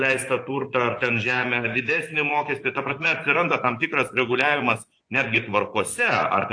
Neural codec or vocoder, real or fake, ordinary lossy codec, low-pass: vocoder, 24 kHz, 100 mel bands, Vocos; fake; AAC, 48 kbps; 9.9 kHz